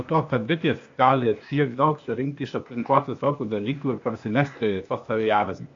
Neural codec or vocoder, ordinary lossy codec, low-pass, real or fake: codec, 16 kHz, 0.8 kbps, ZipCodec; AAC, 48 kbps; 7.2 kHz; fake